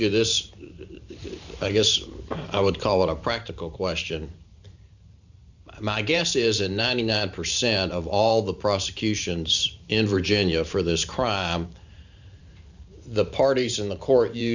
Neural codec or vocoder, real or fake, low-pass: vocoder, 44.1 kHz, 128 mel bands every 512 samples, BigVGAN v2; fake; 7.2 kHz